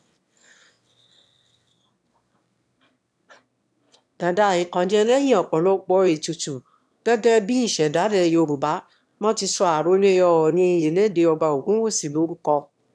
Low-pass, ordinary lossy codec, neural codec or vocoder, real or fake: none; none; autoencoder, 22.05 kHz, a latent of 192 numbers a frame, VITS, trained on one speaker; fake